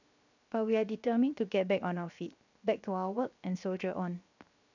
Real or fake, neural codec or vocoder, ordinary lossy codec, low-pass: fake; codec, 16 kHz, 0.7 kbps, FocalCodec; none; 7.2 kHz